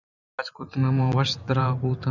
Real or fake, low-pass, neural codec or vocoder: fake; 7.2 kHz; vocoder, 44.1 kHz, 128 mel bands every 512 samples, BigVGAN v2